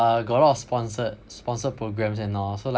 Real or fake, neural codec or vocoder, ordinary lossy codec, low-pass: real; none; none; none